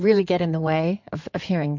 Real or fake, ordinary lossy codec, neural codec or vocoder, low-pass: fake; MP3, 48 kbps; codec, 16 kHz in and 24 kHz out, 2.2 kbps, FireRedTTS-2 codec; 7.2 kHz